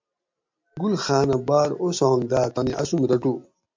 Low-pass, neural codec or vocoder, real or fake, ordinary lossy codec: 7.2 kHz; none; real; MP3, 48 kbps